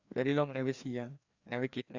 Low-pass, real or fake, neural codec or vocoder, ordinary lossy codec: 7.2 kHz; fake; codec, 16 kHz, 2 kbps, FreqCodec, larger model; Opus, 64 kbps